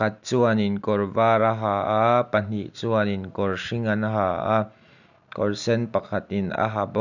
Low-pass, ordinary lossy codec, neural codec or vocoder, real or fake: 7.2 kHz; none; none; real